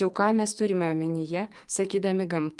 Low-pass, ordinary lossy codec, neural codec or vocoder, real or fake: 10.8 kHz; Opus, 32 kbps; codec, 44.1 kHz, 2.6 kbps, SNAC; fake